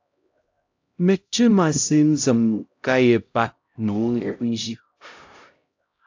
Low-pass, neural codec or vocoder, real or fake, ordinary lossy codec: 7.2 kHz; codec, 16 kHz, 0.5 kbps, X-Codec, HuBERT features, trained on LibriSpeech; fake; AAC, 48 kbps